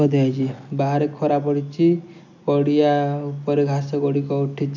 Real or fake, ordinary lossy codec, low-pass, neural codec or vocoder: real; none; 7.2 kHz; none